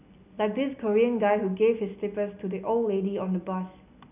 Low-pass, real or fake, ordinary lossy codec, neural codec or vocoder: 3.6 kHz; real; none; none